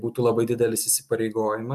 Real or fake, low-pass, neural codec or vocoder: real; 14.4 kHz; none